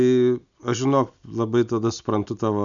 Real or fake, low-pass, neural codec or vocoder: real; 7.2 kHz; none